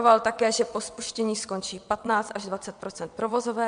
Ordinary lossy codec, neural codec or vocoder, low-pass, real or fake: MP3, 64 kbps; vocoder, 22.05 kHz, 80 mel bands, WaveNeXt; 9.9 kHz; fake